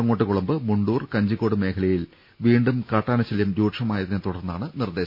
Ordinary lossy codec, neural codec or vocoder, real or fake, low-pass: MP3, 32 kbps; none; real; 5.4 kHz